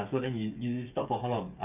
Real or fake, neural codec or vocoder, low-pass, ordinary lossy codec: fake; codec, 16 kHz, 8 kbps, FreqCodec, smaller model; 3.6 kHz; none